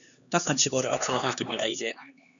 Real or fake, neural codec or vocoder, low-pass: fake; codec, 16 kHz, 2 kbps, X-Codec, HuBERT features, trained on LibriSpeech; 7.2 kHz